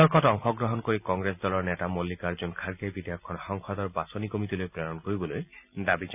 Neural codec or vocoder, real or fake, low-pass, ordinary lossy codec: none; real; 3.6 kHz; none